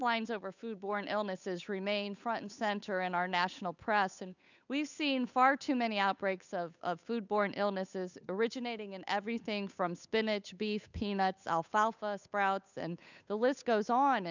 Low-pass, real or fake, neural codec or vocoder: 7.2 kHz; fake; codec, 16 kHz, 8 kbps, FunCodec, trained on Chinese and English, 25 frames a second